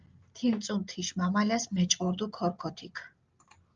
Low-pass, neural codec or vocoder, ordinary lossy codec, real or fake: 7.2 kHz; none; Opus, 24 kbps; real